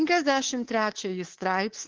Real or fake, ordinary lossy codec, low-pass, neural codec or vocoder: fake; Opus, 16 kbps; 7.2 kHz; codec, 24 kHz, 3.1 kbps, DualCodec